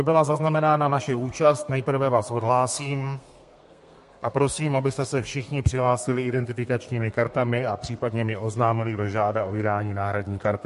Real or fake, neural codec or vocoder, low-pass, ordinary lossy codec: fake; codec, 32 kHz, 1.9 kbps, SNAC; 14.4 kHz; MP3, 48 kbps